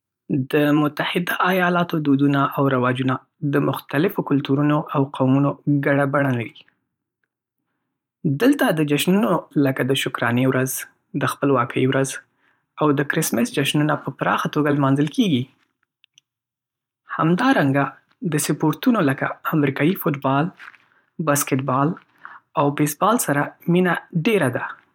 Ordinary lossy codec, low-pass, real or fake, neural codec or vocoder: none; 19.8 kHz; fake; vocoder, 44.1 kHz, 128 mel bands every 512 samples, BigVGAN v2